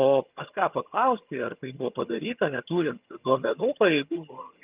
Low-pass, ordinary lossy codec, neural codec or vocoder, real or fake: 3.6 kHz; Opus, 24 kbps; vocoder, 22.05 kHz, 80 mel bands, HiFi-GAN; fake